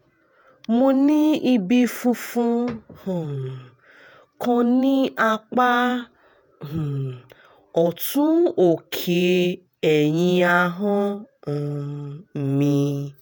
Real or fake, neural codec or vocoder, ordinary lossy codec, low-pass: fake; vocoder, 48 kHz, 128 mel bands, Vocos; none; none